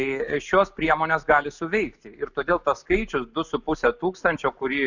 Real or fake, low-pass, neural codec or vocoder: real; 7.2 kHz; none